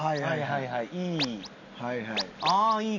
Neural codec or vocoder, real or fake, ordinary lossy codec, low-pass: none; real; none; 7.2 kHz